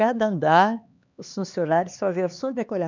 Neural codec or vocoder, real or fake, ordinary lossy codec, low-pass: codec, 16 kHz, 2 kbps, X-Codec, HuBERT features, trained on LibriSpeech; fake; none; 7.2 kHz